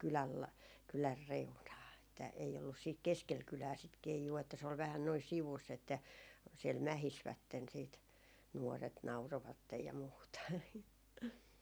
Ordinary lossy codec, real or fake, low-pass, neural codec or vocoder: none; real; none; none